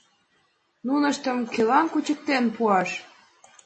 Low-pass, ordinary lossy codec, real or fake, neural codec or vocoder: 10.8 kHz; MP3, 32 kbps; real; none